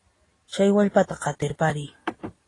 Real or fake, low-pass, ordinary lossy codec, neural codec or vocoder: real; 10.8 kHz; AAC, 32 kbps; none